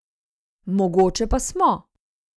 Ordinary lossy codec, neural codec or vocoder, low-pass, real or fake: none; none; none; real